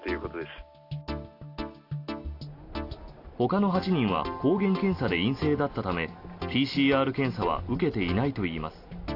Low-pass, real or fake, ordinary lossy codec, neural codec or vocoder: 5.4 kHz; real; AAC, 32 kbps; none